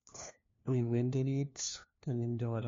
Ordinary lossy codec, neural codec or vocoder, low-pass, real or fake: MP3, 48 kbps; codec, 16 kHz, 1 kbps, FunCodec, trained on LibriTTS, 50 frames a second; 7.2 kHz; fake